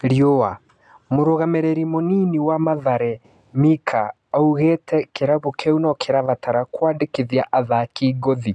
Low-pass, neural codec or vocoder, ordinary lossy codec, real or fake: none; none; none; real